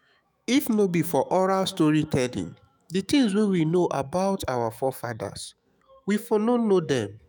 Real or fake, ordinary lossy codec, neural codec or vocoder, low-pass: fake; none; autoencoder, 48 kHz, 128 numbers a frame, DAC-VAE, trained on Japanese speech; none